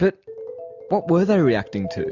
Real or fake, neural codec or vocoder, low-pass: real; none; 7.2 kHz